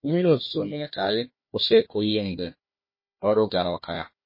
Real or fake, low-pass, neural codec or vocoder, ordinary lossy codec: fake; 5.4 kHz; codec, 16 kHz, 1 kbps, FunCodec, trained on Chinese and English, 50 frames a second; MP3, 24 kbps